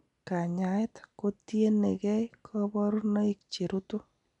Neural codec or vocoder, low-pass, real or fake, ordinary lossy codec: none; 10.8 kHz; real; none